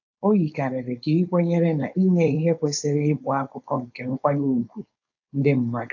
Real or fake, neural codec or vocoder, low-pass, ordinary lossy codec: fake; codec, 16 kHz, 4.8 kbps, FACodec; 7.2 kHz; AAC, 48 kbps